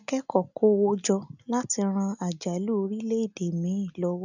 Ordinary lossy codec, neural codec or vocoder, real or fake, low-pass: none; none; real; 7.2 kHz